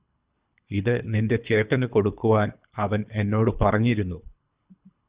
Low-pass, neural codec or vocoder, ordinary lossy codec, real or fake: 3.6 kHz; codec, 24 kHz, 3 kbps, HILCodec; Opus, 64 kbps; fake